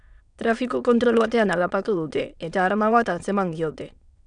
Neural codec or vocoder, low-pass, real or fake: autoencoder, 22.05 kHz, a latent of 192 numbers a frame, VITS, trained on many speakers; 9.9 kHz; fake